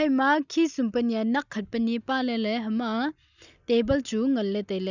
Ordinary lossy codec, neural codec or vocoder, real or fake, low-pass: none; none; real; 7.2 kHz